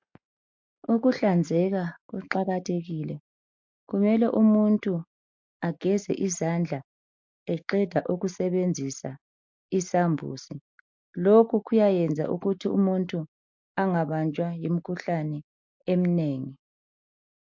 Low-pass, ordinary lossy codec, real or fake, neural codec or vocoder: 7.2 kHz; MP3, 48 kbps; real; none